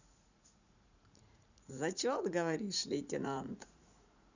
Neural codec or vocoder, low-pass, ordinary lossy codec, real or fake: vocoder, 44.1 kHz, 80 mel bands, Vocos; 7.2 kHz; none; fake